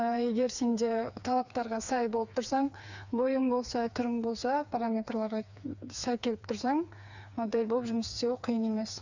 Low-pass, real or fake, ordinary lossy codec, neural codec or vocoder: 7.2 kHz; fake; none; codec, 16 kHz, 4 kbps, FreqCodec, smaller model